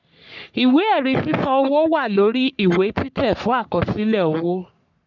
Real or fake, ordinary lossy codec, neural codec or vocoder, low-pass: fake; none; codec, 44.1 kHz, 3.4 kbps, Pupu-Codec; 7.2 kHz